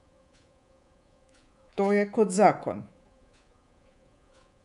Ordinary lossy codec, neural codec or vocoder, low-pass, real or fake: none; codec, 24 kHz, 3.1 kbps, DualCodec; 10.8 kHz; fake